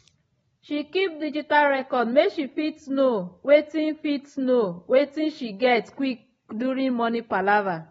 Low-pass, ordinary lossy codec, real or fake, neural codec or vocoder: 19.8 kHz; AAC, 24 kbps; real; none